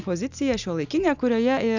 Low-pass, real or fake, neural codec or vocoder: 7.2 kHz; real; none